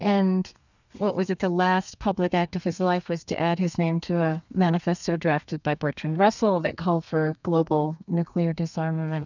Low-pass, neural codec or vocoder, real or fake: 7.2 kHz; codec, 32 kHz, 1.9 kbps, SNAC; fake